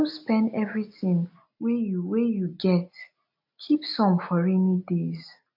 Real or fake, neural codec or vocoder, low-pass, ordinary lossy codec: real; none; 5.4 kHz; AAC, 48 kbps